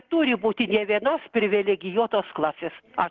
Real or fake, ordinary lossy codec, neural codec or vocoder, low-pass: real; Opus, 16 kbps; none; 7.2 kHz